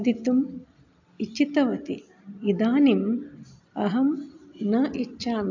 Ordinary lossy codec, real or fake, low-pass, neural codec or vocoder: AAC, 48 kbps; real; 7.2 kHz; none